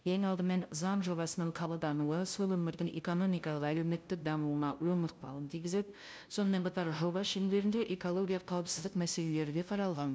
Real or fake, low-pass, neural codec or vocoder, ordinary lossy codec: fake; none; codec, 16 kHz, 0.5 kbps, FunCodec, trained on LibriTTS, 25 frames a second; none